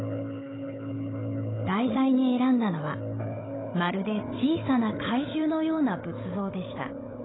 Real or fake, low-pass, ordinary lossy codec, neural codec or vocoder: fake; 7.2 kHz; AAC, 16 kbps; codec, 16 kHz, 16 kbps, FunCodec, trained on Chinese and English, 50 frames a second